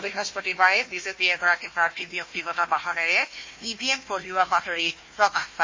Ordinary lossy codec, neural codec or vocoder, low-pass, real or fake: MP3, 32 kbps; codec, 16 kHz, 1 kbps, FunCodec, trained on Chinese and English, 50 frames a second; 7.2 kHz; fake